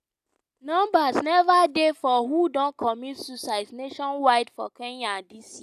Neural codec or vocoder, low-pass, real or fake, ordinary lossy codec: none; 14.4 kHz; real; none